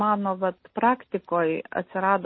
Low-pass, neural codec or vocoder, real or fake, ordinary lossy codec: 7.2 kHz; none; real; MP3, 24 kbps